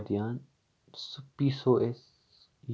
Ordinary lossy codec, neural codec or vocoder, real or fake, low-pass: none; none; real; none